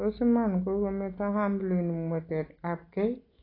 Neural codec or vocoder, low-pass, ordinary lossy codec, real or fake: none; 5.4 kHz; AAC, 24 kbps; real